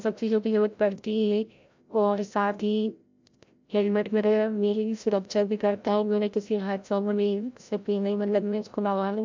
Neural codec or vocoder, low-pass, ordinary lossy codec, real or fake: codec, 16 kHz, 0.5 kbps, FreqCodec, larger model; 7.2 kHz; MP3, 64 kbps; fake